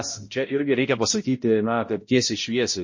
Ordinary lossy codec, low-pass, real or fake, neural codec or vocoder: MP3, 32 kbps; 7.2 kHz; fake; codec, 16 kHz, 0.5 kbps, X-Codec, HuBERT features, trained on balanced general audio